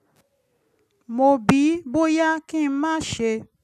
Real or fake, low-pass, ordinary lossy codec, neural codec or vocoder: real; 14.4 kHz; none; none